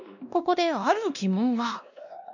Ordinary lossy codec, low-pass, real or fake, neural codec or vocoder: none; 7.2 kHz; fake; codec, 16 kHz, 1 kbps, X-Codec, WavLM features, trained on Multilingual LibriSpeech